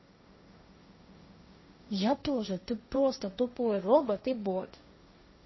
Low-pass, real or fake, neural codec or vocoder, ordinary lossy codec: 7.2 kHz; fake; codec, 16 kHz, 1.1 kbps, Voila-Tokenizer; MP3, 24 kbps